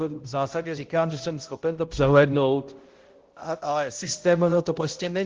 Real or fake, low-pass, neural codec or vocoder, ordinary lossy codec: fake; 7.2 kHz; codec, 16 kHz, 0.5 kbps, X-Codec, HuBERT features, trained on balanced general audio; Opus, 16 kbps